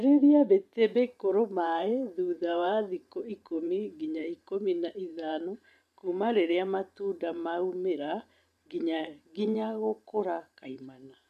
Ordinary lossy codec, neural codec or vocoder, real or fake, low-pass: none; vocoder, 44.1 kHz, 128 mel bands every 512 samples, BigVGAN v2; fake; 14.4 kHz